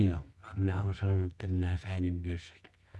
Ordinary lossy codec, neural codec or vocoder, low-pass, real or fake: none; codec, 24 kHz, 0.9 kbps, WavTokenizer, medium music audio release; none; fake